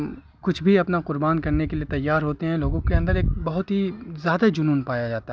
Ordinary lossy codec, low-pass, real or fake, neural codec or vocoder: none; none; real; none